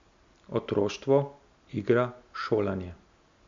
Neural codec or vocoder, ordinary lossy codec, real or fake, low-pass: none; MP3, 64 kbps; real; 7.2 kHz